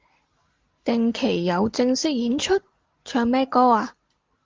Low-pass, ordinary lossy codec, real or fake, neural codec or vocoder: 7.2 kHz; Opus, 16 kbps; real; none